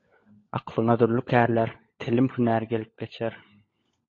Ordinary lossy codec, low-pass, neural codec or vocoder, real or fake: AAC, 32 kbps; 7.2 kHz; codec, 16 kHz, 16 kbps, FunCodec, trained on LibriTTS, 50 frames a second; fake